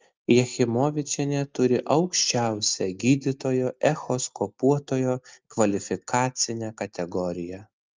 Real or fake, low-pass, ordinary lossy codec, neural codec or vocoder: real; 7.2 kHz; Opus, 24 kbps; none